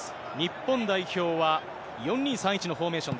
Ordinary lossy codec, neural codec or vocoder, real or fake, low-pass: none; none; real; none